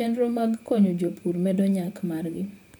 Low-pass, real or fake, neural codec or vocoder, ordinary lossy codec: none; fake; vocoder, 44.1 kHz, 128 mel bands, Pupu-Vocoder; none